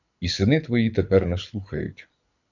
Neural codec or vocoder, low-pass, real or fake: codec, 24 kHz, 6 kbps, HILCodec; 7.2 kHz; fake